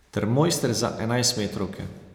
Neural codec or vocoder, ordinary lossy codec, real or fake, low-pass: none; none; real; none